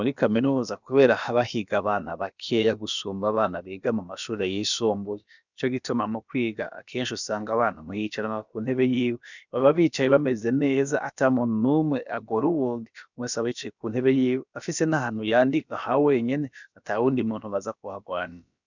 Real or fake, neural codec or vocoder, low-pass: fake; codec, 16 kHz, about 1 kbps, DyCAST, with the encoder's durations; 7.2 kHz